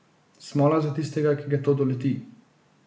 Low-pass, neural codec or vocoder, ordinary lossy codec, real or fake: none; none; none; real